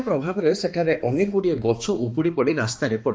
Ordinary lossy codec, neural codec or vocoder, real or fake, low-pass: none; codec, 16 kHz, 2 kbps, X-Codec, HuBERT features, trained on balanced general audio; fake; none